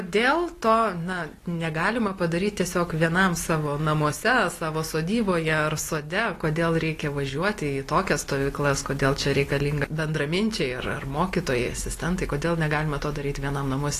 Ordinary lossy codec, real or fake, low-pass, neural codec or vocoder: AAC, 48 kbps; real; 14.4 kHz; none